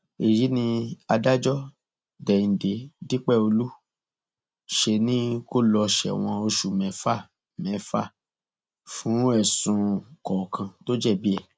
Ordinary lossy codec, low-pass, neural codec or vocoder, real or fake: none; none; none; real